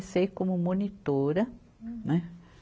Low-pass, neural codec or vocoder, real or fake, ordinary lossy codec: none; none; real; none